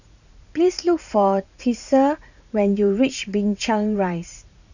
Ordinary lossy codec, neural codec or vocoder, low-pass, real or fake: none; vocoder, 44.1 kHz, 80 mel bands, Vocos; 7.2 kHz; fake